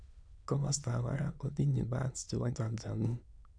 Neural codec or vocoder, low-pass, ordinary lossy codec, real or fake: autoencoder, 22.05 kHz, a latent of 192 numbers a frame, VITS, trained on many speakers; 9.9 kHz; none; fake